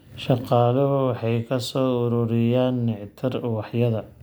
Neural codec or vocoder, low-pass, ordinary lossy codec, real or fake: none; none; none; real